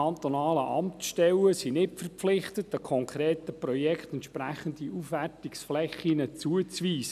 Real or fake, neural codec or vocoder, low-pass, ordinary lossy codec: real; none; 14.4 kHz; none